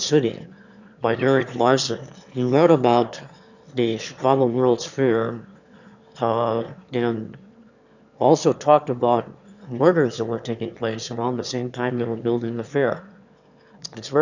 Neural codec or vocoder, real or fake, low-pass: autoencoder, 22.05 kHz, a latent of 192 numbers a frame, VITS, trained on one speaker; fake; 7.2 kHz